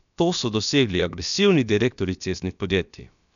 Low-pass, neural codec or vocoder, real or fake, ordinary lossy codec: 7.2 kHz; codec, 16 kHz, about 1 kbps, DyCAST, with the encoder's durations; fake; none